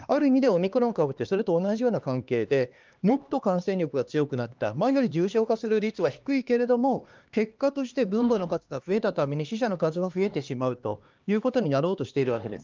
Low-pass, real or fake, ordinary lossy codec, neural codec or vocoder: 7.2 kHz; fake; Opus, 32 kbps; codec, 16 kHz, 2 kbps, X-Codec, HuBERT features, trained on LibriSpeech